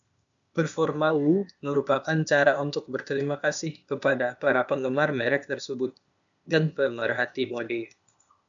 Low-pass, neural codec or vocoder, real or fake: 7.2 kHz; codec, 16 kHz, 0.8 kbps, ZipCodec; fake